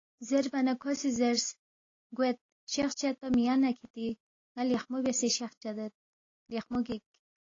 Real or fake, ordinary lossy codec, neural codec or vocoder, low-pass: real; AAC, 32 kbps; none; 7.2 kHz